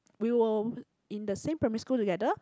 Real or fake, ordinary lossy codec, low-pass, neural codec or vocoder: real; none; none; none